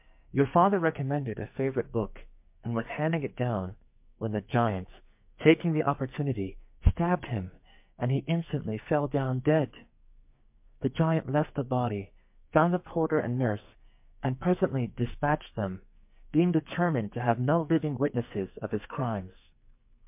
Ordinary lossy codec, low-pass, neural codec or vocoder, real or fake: MP3, 32 kbps; 3.6 kHz; codec, 44.1 kHz, 2.6 kbps, SNAC; fake